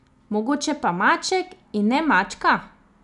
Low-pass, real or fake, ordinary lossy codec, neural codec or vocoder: 10.8 kHz; real; none; none